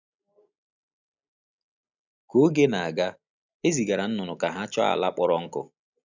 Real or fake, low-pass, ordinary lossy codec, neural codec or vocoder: real; 7.2 kHz; none; none